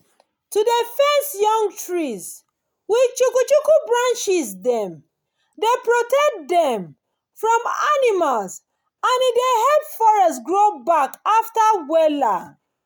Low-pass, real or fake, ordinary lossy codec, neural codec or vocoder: none; real; none; none